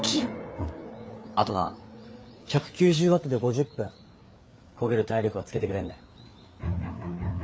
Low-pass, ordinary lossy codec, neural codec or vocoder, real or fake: none; none; codec, 16 kHz, 4 kbps, FreqCodec, larger model; fake